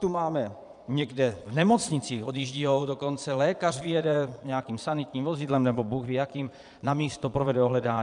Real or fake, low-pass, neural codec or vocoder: fake; 9.9 kHz; vocoder, 22.05 kHz, 80 mel bands, Vocos